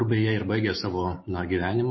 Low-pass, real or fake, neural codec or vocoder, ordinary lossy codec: 7.2 kHz; real; none; MP3, 24 kbps